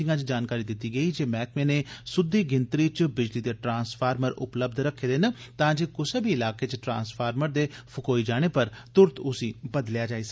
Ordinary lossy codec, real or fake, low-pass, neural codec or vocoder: none; real; none; none